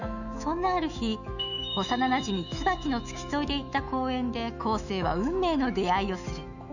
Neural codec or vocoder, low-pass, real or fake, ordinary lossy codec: autoencoder, 48 kHz, 128 numbers a frame, DAC-VAE, trained on Japanese speech; 7.2 kHz; fake; none